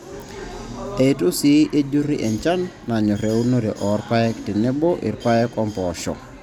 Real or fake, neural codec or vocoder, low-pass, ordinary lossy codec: real; none; 19.8 kHz; none